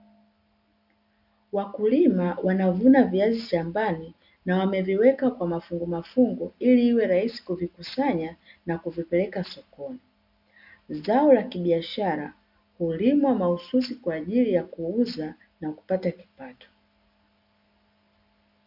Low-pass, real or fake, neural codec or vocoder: 5.4 kHz; real; none